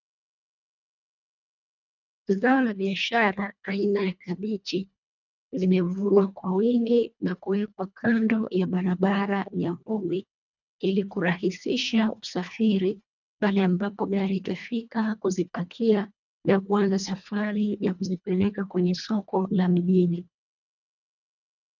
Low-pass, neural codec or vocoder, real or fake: 7.2 kHz; codec, 24 kHz, 1.5 kbps, HILCodec; fake